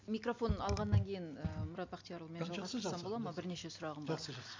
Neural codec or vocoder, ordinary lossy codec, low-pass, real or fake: none; MP3, 64 kbps; 7.2 kHz; real